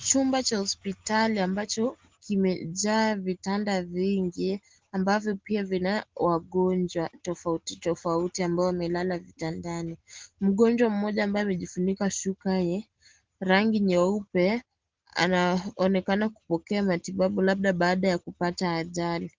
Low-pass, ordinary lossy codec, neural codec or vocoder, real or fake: 7.2 kHz; Opus, 16 kbps; none; real